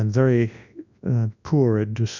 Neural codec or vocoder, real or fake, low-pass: codec, 24 kHz, 0.9 kbps, WavTokenizer, large speech release; fake; 7.2 kHz